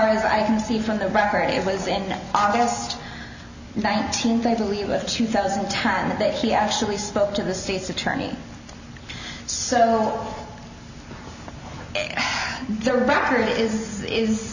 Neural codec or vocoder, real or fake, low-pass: none; real; 7.2 kHz